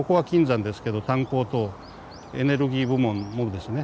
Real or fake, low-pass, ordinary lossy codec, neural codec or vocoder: real; none; none; none